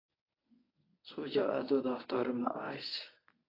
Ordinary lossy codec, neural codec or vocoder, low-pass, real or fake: AAC, 24 kbps; codec, 24 kHz, 0.9 kbps, WavTokenizer, medium speech release version 1; 5.4 kHz; fake